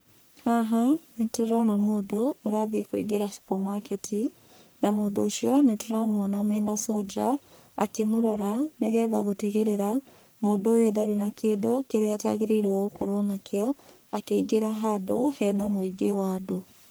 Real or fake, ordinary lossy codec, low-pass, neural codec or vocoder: fake; none; none; codec, 44.1 kHz, 1.7 kbps, Pupu-Codec